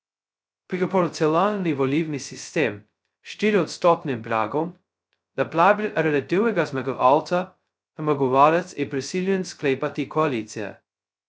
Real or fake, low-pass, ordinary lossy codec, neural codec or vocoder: fake; none; none; codec, 16 kHz, 0.2 kbps, FocalCodec